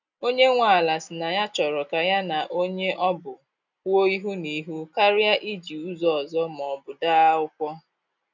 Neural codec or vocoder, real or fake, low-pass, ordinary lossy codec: none; real; 7.2 kHz; none